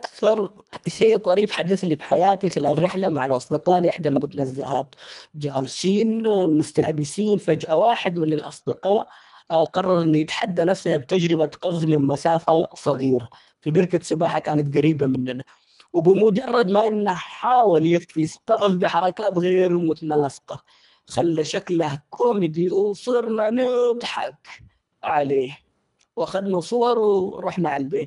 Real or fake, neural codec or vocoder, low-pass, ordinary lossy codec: fake; codec, 24 kHz, 1.5 kbps, HILCodec; 10.8 kHz; none